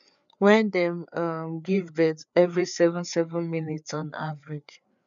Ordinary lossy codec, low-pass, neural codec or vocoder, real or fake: none; 7.2 kHz; codec, 16 kHz, 4 kbps, FreqCodec, larger model; fake